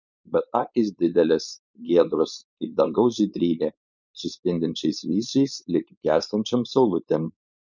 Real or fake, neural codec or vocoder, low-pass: fake; codec, 16 kHz, 4.8 kbps, FACodec; 7.2 kHz